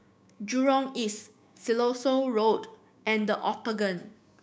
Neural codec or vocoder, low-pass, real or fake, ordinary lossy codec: codec, 16 kHz, 6 kbps, DAC; none; fake; none